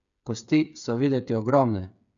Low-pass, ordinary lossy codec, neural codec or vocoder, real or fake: 7.2 kHz; none; codec, 16 kHz, 8 kbps, FreqCodec, smaller model; fake